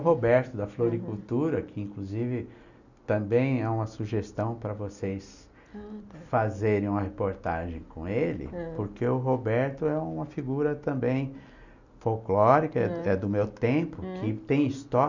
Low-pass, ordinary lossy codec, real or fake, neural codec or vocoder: 7.2 kHz; none; real; none